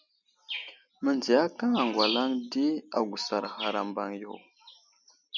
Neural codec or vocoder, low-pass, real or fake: none; 7.2 kHz; real